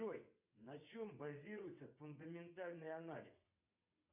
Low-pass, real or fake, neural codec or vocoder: 3.6 kHz; fake; vocoder, 22.05 kHz, 80 mel bands, WaveNeXt